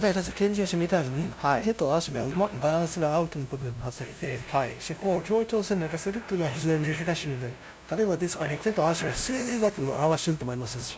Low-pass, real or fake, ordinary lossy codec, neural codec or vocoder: none; fake; none; codec, 16 kHz, 0.5 kbps, FunCodec, trained on LibriTTS, 25 frames a second